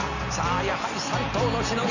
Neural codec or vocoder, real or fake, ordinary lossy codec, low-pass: none; real; none; 7.2 kHz